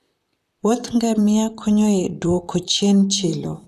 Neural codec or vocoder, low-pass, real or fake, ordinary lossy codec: vocoder, 44.1 kHz, 128 mel bands, Pupu-Vocoder; 14.4 kHz; fake; none